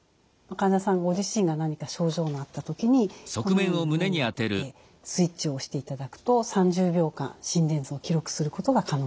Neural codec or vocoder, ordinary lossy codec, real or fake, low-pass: none; none; real; none